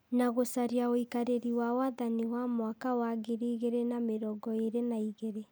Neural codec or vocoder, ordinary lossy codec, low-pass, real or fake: none; none; none; real